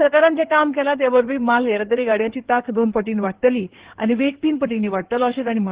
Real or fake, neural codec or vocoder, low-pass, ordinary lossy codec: fake; codec, 16 kHz in and 24 kHz out, 2.2 kbps, FireRedTTS-2 codec; 3.6 kHz; Opus, 16 kbps